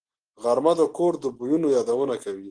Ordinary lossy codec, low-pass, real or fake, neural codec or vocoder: Opus, 16 kbps; 9.9 kHz; real; none